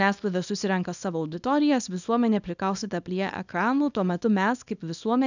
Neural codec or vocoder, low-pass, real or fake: codec, 24 kHz, 0.9 kbps, WavTokenizer, small release; 7.2 kHz; fake